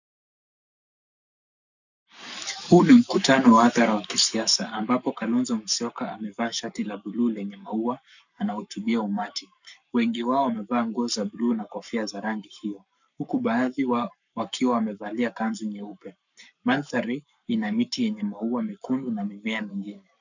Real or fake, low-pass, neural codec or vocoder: fake; 7.2 kHz; codec, 44.1 kHz, 7.8 kbps, Pupu-Codec